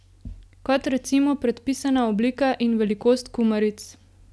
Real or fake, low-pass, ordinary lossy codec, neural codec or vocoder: real; none; none; none